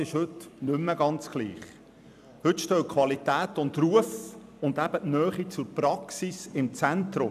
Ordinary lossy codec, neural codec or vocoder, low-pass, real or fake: none; vocoder, 44.1 kHz, 128 mel bands every 256 samples, BigVGAN v2; 14.4 kHz; fake